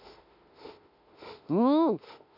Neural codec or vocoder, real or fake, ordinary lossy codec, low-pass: autoencoder, 48 kHz, 32 numbers a frame, DAC-VAE, trained on Japanese speech; fake; none; 5.4 kHz